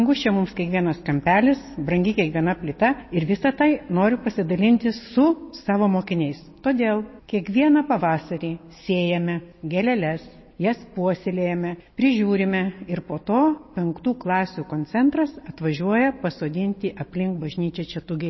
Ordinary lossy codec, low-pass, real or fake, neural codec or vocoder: MP3, 24 kbps; 7.2 kHz; real; none